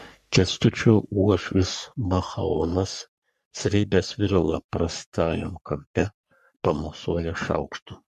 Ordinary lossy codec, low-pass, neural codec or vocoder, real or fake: MP3, 64 kbps; 14.4 kHz; codec, 44.1 kHz, 3.4 kbps, Pupu-Codec; fake